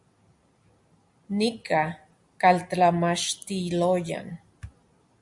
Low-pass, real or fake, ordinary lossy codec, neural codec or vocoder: 10.8 kHz; real; MP3, 64 kbps; none